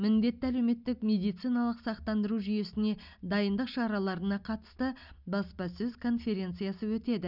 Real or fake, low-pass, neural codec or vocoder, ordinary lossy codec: real; 5.4 kHz; none; none